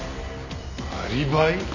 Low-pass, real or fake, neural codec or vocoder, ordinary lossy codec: 7.2 kHz; real; none; none